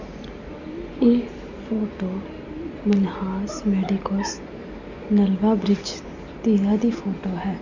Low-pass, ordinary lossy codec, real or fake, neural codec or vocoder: 7.2 kHz; none; real; none